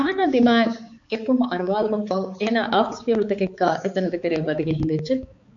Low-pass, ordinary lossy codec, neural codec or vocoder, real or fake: 7.2 kHz; MP3, 48 kbps; codec, 16 kHz, 4 kbps, X-Codec, HuBERT features, trained on balanced general audio; fake